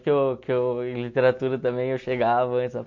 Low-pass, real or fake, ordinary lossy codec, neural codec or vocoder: 7.2 kHz; real; MP3, 48 kbps; none